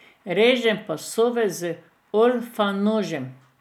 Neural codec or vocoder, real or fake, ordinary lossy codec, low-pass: none; real; none; 19.8 kHz